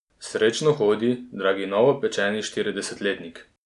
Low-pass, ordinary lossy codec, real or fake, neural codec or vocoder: 10.8 kHz; none; real; none